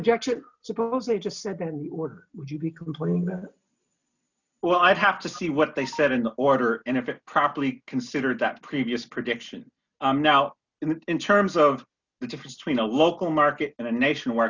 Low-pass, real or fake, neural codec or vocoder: 7.2 kHz; real; none